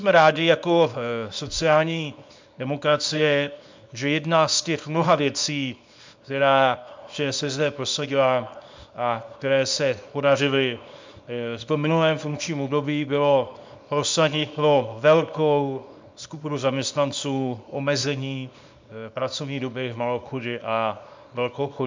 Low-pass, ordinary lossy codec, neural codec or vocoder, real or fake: 7.2 kHz; MP3, 64 kbps; codec, 24 kHz, 0.9 kbps, WavTokenizer, small release; fake